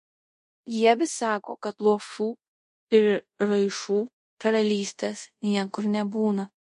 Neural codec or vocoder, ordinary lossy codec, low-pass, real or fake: codec, 24 kHz, 0.5 kbps, DualCodec; MP3, 48 kbps; 10.8 kHz; fake